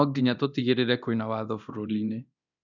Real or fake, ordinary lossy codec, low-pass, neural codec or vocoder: fake; none; 7.2 kHz; codec, 24 kHz, 0.9 kbps, DualCodec